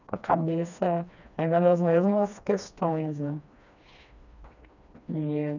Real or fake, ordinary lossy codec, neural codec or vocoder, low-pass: fake; none; codec, 16 kHz, 2 kbps, FreqCodec, smaller model; 7.2 kHz